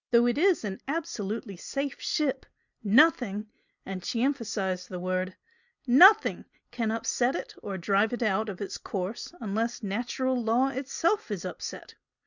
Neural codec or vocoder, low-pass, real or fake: none; 7.2 kHz; real